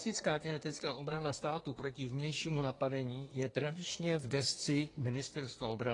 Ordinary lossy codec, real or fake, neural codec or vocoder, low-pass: AAC, 32 kbps; fake; codec, 24 kHz, 1 kbps, SNAC; 10.8 kHz